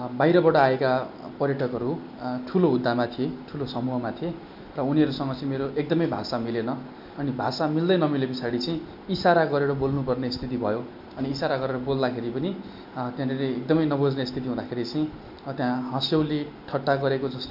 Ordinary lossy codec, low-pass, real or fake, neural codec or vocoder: MP3, 48 kbps; 5.4 kHz; real; none